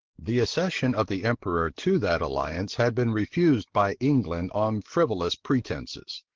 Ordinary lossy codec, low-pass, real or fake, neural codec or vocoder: Opus, 16 kbps; 7.2 kHz; fake; codec, 44.1 kHz, 7.8 kbps, DAC